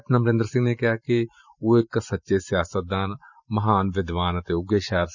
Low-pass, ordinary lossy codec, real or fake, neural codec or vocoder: 7.2 kHz; none; fake; vocoder, 44.1 kHz, 128 mel bands every 512 samples, BigVGAN v2